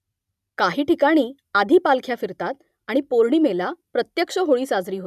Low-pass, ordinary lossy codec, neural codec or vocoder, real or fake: 14.4 kHz; none; none; real